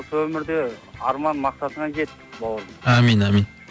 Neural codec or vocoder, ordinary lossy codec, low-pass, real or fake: none; none; none; real